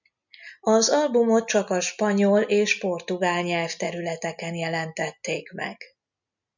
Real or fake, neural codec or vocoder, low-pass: real; none; 7.2 kHz